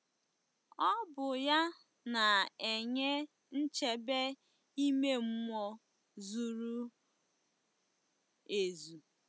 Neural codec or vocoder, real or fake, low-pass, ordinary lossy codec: none; real; none; none